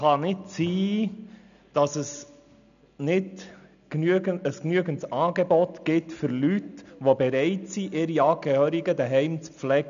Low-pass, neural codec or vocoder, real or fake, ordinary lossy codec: 7.2 kHz; none; real; none